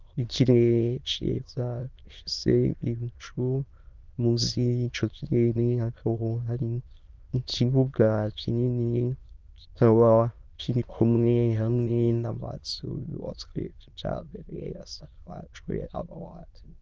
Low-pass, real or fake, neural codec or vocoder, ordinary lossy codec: 7.2 kHz; fake; autoencoder, 22.05 kHz, a latent of 192 numbers a frame, VITS, trained on many speakers; Opus, 24 kbps